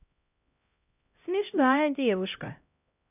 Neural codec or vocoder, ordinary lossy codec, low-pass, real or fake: codec, 16 kHz, 0.5 kbps, X-Codec, HuBERT features, trained on LibriSpeech; none; 3.6 kHz; fake